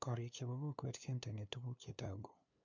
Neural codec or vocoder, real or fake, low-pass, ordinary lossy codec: codec, 16 kHz, 2 kbps, FunCodec, trained on LibriTTS, 25 frames a second; fake; 7.2 kHz; MP3, 64 kbps